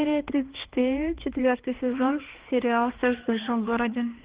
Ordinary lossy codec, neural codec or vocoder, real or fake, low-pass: Opus, 16 kbps; codec, 16 kHz, 2 kbps, X-Codec, HuBERT features, trained on balanced general audio; fake; 3.6 kHz